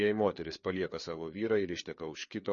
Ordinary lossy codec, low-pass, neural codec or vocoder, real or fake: MP3, 32 kbps; 7.2 kHz; codec, 16 kHz, 4 kbps, FreqCodec, larger model; fake